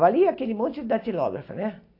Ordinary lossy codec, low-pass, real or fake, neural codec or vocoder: AAC, 32 kbps; 5.4 kHz; real; none